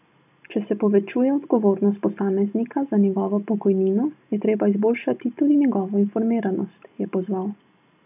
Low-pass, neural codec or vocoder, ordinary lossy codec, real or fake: 3.6 kHz; none; none; real